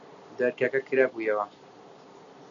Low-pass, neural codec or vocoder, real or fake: 7.2 kHz; none; real